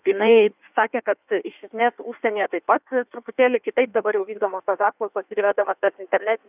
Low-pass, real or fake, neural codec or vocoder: 3.6 kHz; fake; codec, 16 kHz in and 24 kHz out, 1.1 kbps, FireRedTTS-2 codec